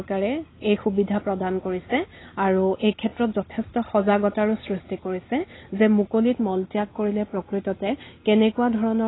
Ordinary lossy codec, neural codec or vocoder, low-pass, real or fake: AAC, 16 kbps; none; 7.2 kHz; real